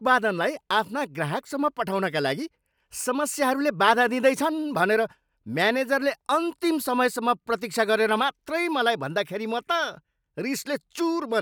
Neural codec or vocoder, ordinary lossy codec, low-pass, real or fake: none; none; none; real